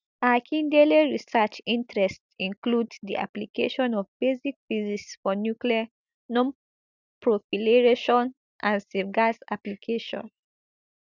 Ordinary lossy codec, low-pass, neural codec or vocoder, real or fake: none; 7.2 kHz; none; real